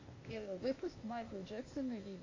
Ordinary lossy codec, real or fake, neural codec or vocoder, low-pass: AAC, 32 kbps; fake; codec, 16 kHz, 0.8 kbps, ZipCodec; 7.2 kHz